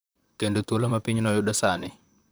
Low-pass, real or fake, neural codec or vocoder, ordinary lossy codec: none; fake; vocoder, 44.1 kHz, 128 mel bands, Pupu-Vocoder; none